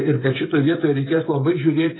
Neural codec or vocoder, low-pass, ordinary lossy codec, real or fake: codec, 16 kHz, 16 kbps, FreqCodec, smaller model; 7.2 kHz; AAC, 16 kbps; fake